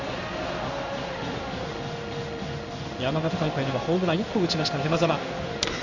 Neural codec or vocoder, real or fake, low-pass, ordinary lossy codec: codec, 16 kHz in and 24 kHz out, 1 kbps, XY-Tokenizer; fake; 7.2 kHz; none